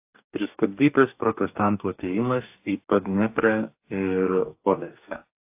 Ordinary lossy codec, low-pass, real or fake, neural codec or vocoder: AAC, 32 kbps; 3.6 kHz; fake; codec, 44.1 kHz, 2.6 kbps, DAC